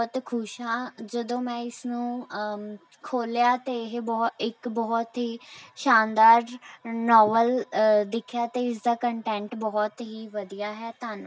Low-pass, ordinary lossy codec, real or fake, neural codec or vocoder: none; none; real; none